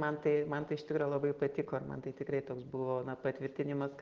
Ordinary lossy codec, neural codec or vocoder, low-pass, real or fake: Opus, 16 kbps; none; 7.2 kHz; real